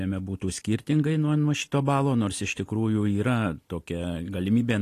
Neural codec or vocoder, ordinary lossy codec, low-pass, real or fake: none; AAC, 48 kbps; 14.4 kHz; real